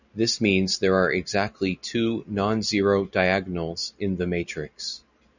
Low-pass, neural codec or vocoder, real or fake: 7.2 kHz; none; real